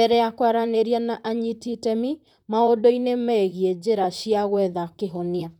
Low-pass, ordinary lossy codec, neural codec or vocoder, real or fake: 19.8 kHz; none; vocoder, 44.1 kHz, 128 mel bands, Pupu-Vocoder; fake